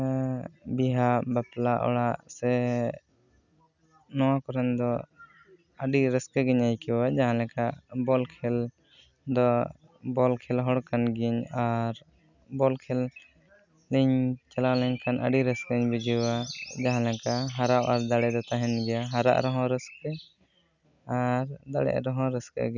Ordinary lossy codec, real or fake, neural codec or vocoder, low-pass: none; real; none; 7.2 kHz